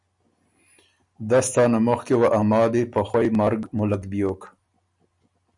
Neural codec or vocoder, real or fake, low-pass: none; real; 10.8 kHz